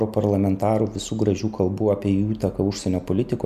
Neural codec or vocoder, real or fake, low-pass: none; real; 14.4 kHz